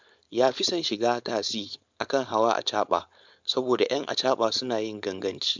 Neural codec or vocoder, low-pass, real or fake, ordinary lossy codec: codec, 16 kHz, 4.8 kbps, FACodec; 7.2 kHz; fake; MP3, 64 kbps